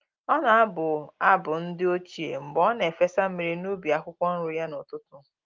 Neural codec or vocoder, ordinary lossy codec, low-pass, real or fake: none; Opus, 24 kbps; 7.2 kHz; real